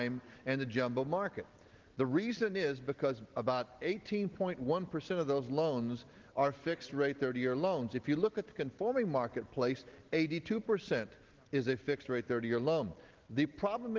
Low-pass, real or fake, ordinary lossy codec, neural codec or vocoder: 7.2 kHz; real; Opus, 16 kbps; none